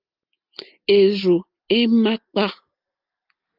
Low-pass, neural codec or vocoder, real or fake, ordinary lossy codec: 5.4 kHz; none; real; Opus, 32 kbps